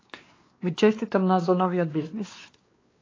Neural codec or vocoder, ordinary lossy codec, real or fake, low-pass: codec, 16 kHz, 2 kbps, X-Codec, WavLM features, trained on Multilingual LibriSpeech; AAC, 32 kbps; fake; 7.2 kHz